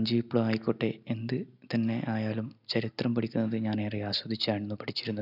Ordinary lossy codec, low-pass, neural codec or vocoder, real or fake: none; 5.4 kHz; none; real